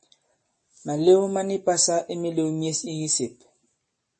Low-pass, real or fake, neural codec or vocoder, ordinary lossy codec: 10.8 kHz; real; none; MP3, 32 kbps